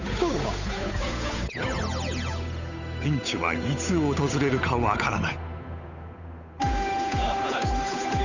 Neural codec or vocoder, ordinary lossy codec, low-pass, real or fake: codec, 16 kHz, 8 kbps, FunCodec, trained on Chinese and English, 25 frames a second; none; 7.2 kHz; fake